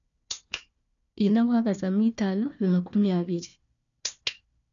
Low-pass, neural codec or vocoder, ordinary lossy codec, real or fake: 7.2 kHz; codec, 16 kHz, 1 kbps, FunCodec, trained on Chinese and English, 50 frames a second; none; fake